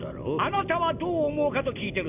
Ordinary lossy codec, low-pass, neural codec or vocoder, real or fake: none; 3.6 kHz; none; real